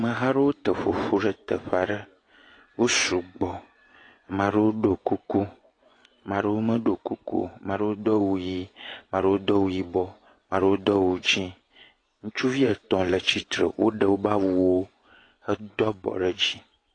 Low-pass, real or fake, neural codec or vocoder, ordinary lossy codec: 9.9 kHz; fake; vocoder, 24 kHz, 100 mel bands, Vocos; AAC, 32 kbps